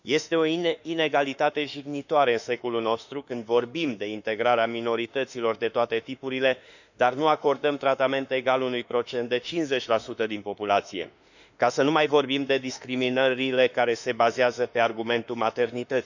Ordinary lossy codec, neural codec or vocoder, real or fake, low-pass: none; autoencoder, 48 kHz, 32 numbers a frame, DAC-VAE, trained on Japanese speech; fake; 7.2 kHz